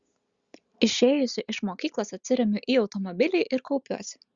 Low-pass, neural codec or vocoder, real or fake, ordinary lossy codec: 7.2 kHz; none; real; Opus, 64 kbps